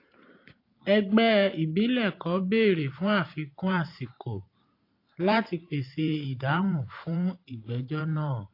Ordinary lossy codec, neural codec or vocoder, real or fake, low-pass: AAC, 32 kbps; vocoder, 44.1 kHz, 128 mel bands every 512 samples, BigVGAN v2; fake; 5.4 kHz